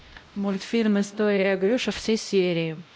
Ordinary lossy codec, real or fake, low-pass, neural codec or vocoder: none; fake; none; codec, 16 kHz, 0.5 kbps, X-Codec, WavLM features, trained on Multilingual LibriSpeech